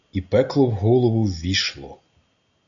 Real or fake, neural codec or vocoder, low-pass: real; none; 7.2 kHz